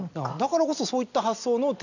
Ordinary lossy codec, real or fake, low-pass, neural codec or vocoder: none; real; 7.2 kHz; none